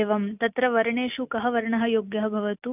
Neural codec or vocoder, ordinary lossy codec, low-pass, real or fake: none; none; 3.6 kHz; real